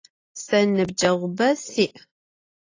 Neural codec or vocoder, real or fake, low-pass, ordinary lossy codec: none; real; 7.2 kHz; AAC, 32 kbps